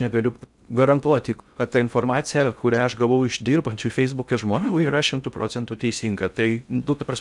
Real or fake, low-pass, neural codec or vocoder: fake; 10.8 kHz; codec, 16 kHz in and 24 kHz out, 0.6 kbps, FocalCodec, streaming, 2048 codes